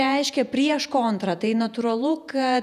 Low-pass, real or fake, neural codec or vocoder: 14.4 kHz; fake; vocoder, 48 kHz, 128 mel bands, Vocos